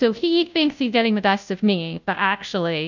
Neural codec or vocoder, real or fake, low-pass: codec, 16 kHz, 0.5 kbps, FunCodec, trained on Chinese and English, 25 frames a second; fake; 7.2 kHz